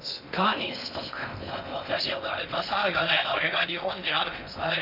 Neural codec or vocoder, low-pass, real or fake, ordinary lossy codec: codec, 16 kHz in and 24 kHz out, 0.6 kbps, FocalCodec, streaming, 2048 codes; 5.4 kHz; fake; none